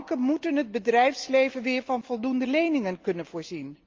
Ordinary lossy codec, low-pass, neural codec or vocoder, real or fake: Opus, 24 kbps; 7.2 kHz; none; real